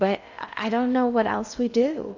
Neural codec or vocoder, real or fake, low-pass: codec, 16 kHz in and 24 kHz out, 0.6 kbps, FocalCodec, streaming, 2048 codes; fake; 7.2 kHz